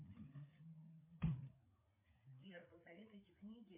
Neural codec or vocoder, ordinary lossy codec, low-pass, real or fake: codec, 16 kHz, 4 kbps, FreqCodec, larger model; MP3, 24 kbps; 3.6 kHz; fake